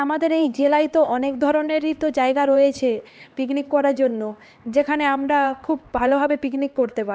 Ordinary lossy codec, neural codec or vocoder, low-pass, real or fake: none; codec, 16 kHz, 2 kbps, X-Codec, HuBERT features, trained on LibriSpeech; none; fake